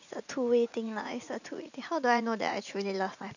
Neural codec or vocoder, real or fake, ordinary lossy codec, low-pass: vocoder, 44.1 kHz, 80 mel bands, Vocos; fake; none; 7.2 kHz